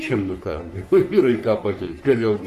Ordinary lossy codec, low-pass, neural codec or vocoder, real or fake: Opus, 64 kbps; 14.4 kHz; codec, 44.1 kHz, 3.4 kbps, Pupu-Codec; fake